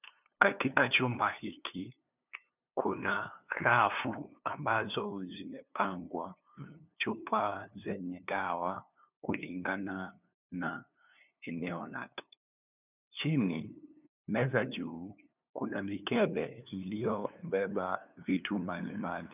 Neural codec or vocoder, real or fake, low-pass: codec, 16 kHz, 2 kbps, FunCodec, trained on LibriTTS, 25 frames a second; fake; 3.6 kHz